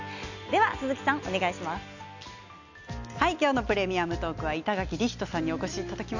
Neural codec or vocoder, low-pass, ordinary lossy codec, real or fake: none; 7.2 kHz; none; real